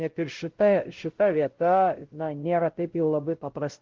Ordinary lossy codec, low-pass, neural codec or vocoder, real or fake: Opus, 16 kbps; 7.2 kHz; codec, 16 kHz, 0.5 kbps, X-Codec, WavLM features, trained on Multilingual LibriSpeech; fake